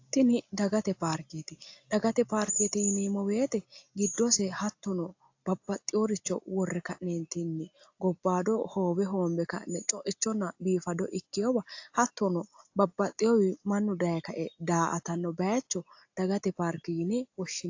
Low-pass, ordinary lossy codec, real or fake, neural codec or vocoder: 7.2 kHz; AAC, 48 kbps; real; none